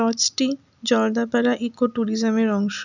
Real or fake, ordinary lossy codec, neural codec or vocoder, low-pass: real; none; none; 7.2 kHz